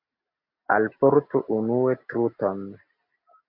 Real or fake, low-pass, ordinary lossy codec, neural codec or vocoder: real; 5.4 kHz; MP3, 48 kbps; none